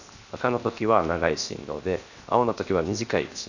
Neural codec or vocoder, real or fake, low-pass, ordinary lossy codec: codec, 16 kHz, 0.7 kbps, FocalCodec; fake; 7.2 kHz; none